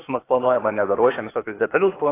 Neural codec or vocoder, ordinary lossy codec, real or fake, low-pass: codec, 16 kHz, 0.8 kbps, ZipCodec; AAC, 16 kbps; fake; 3.6 kHz